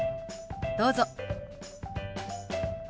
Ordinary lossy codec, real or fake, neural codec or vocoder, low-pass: none; real; none; none